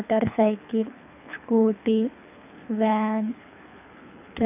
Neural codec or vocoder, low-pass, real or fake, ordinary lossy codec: codec, 24 kHz, 3 kbps, HILCodec; 3.6 kHz; fake; none